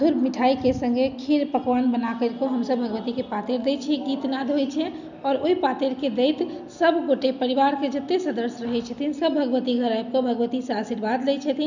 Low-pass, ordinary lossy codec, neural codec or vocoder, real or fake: 7.2 kHz; none; none; real